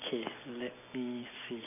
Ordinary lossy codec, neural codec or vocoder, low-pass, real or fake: none; none; 3.6 kHz; real